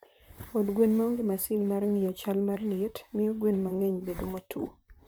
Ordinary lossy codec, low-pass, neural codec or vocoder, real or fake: none; none; vocoder, 44.1 kHz, 128 mel bands, Pupu-Vocoder; fake